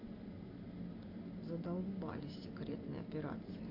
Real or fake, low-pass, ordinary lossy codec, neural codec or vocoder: real; 5.4 kHz; MP3, 32 kbps; none